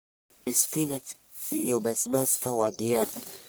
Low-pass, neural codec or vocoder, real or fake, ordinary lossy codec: none; codec, 44.1 kHz, 1.7 kbps, Pupu-Codec; fake; none